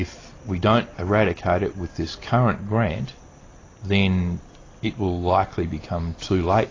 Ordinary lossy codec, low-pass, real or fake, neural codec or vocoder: AAC, 32 kbps; 7.2 kHz; real; none